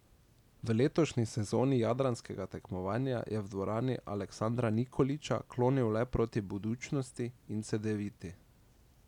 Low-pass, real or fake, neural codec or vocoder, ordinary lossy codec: 19.8 kHz; real; none; none